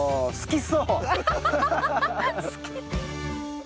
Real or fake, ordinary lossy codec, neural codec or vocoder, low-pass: real; none; none; none